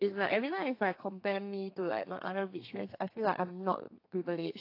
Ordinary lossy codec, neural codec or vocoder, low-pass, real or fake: AAC, 32 kbps; codec, 32 kHz, 1.9 kbps, SNAC; 5.4 kHz; fake